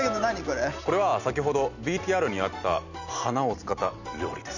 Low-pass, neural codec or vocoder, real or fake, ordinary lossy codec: 7.2 kHz; none; real; none